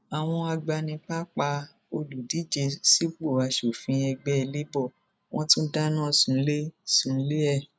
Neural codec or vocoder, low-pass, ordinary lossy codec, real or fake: none; none; none; real